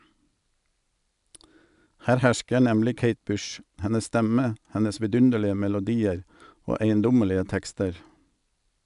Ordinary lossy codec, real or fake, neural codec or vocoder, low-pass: none; real; none; 10.8 kHz